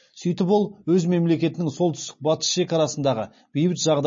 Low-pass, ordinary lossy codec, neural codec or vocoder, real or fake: 7.2 kHz; MP3, 32 kbps; none; real